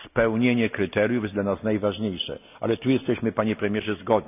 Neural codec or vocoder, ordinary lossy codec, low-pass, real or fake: none; none; 3.6 kHz; real